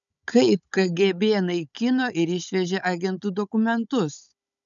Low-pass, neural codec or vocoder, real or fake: 7.2 kHz; codec, 16 kHz, 16 kbps, FunCodec, trained on Chinese and English, 50 frames a second; fake